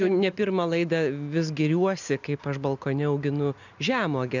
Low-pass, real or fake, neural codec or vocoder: 7.2 kHz; real; none